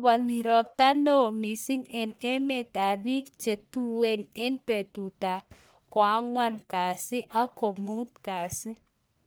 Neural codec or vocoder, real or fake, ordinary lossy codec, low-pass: codec, 44.1 kHz, 1.7 kbps, Pupu-Codec; fake; none; none